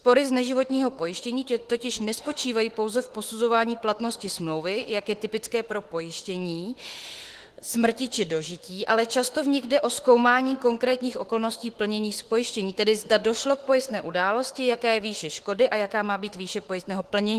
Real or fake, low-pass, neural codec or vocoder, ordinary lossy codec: fake; 14.4 kHz; autoencoder, 48 kHz, 32 numbers a frame, DAC-VAE, trained on Japanese speech; Opus, 16 kbps